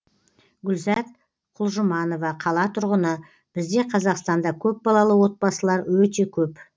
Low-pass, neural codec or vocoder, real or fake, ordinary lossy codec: none; none; real; none